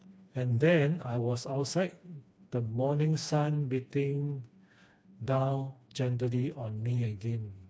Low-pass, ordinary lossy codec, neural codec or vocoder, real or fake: none; none; codec, 16 kHz, 2 kbps, FreqCodec, smaller model; fake